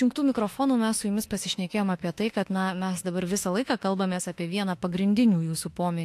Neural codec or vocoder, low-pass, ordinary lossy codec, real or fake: autoencoder, 48 kHz, 32 numbers a frame, DAC-VAE, trained on Japanese speech; 14.4 kHz; AAC, 64 kbps; fake